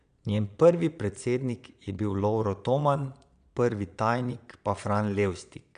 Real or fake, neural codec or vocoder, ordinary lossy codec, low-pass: fake; vocoder, 22.05 kHz, 80 mel bands, Vocos; none; 9.9 kHz